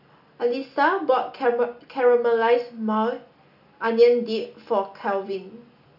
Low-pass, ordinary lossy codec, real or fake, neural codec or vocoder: 5.4 kHz; none; real; none